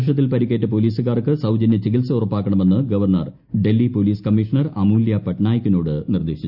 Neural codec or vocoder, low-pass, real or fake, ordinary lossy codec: none; 5.4 kHz; real; none